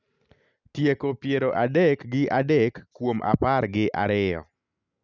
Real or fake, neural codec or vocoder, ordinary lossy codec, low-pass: real; none; none; 7.2 kHz